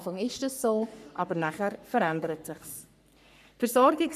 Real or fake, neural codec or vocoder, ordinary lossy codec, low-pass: fake; codec, 44.1 kHz, 3.4 kbps, Pupu-Codec; none; 14.4 kHz